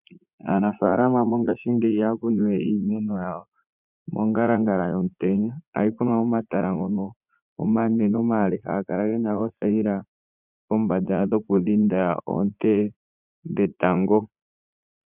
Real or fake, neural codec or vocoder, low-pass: fake; vocoder, 44.1 kHz, 80 mel bands, Vocos; 3.6 kHz